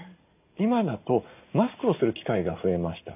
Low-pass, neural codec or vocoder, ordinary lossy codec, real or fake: 3.6 kHz; none; none; real